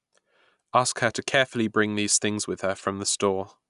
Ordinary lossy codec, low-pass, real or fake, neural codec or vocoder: none; 10.8 kHz; real; none